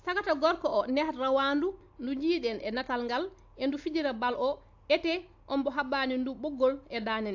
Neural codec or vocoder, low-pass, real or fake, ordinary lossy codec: none; 7.2 kHz; real; AAC, 48 kbps